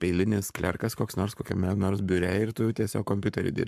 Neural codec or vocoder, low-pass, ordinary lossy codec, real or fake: codec, 44.1 kHz, 7.8 kbps, Pupu-Codec; 14.4 kHz; MP3, 96 kbps; fake